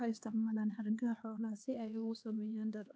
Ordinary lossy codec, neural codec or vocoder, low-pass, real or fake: none; codec, 16 kHz, 2 kbps, X-Codec, HuBERT features, trained on LibriSpeech; none; fake